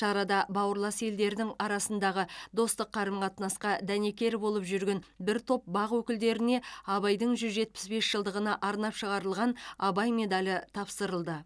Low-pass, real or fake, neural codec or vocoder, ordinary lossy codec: none; real; none; none